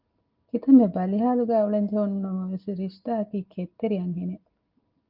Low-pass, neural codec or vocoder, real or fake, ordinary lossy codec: 5.4 kHz; none; real; Opus, 32 kbps